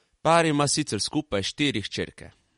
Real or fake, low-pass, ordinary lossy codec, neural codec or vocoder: real; 19.8 kHz; MP3, 48 kbps; none